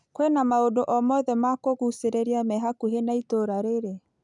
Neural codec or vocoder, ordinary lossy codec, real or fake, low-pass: none; none; real; 10.8 kHz